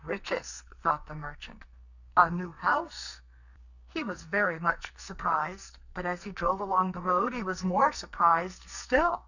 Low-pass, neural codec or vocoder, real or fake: 7.2 kHz; codec, 44.1 kHz, 2.6 kbps, SNAC; fake